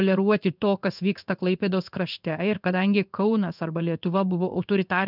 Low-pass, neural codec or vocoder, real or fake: 5.4 kHz; codec, 16 kHz in and 24 kHz out, 1 kbps, XY-Tokenizer; fake